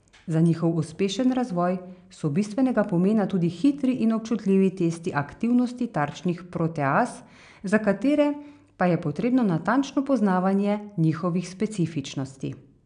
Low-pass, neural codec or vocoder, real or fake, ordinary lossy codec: 9.9 kHz; none; real; MP3, 96 kbps